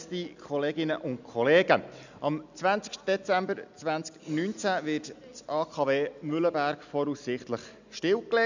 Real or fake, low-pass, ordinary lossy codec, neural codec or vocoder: real; 7.2 kHz; none; none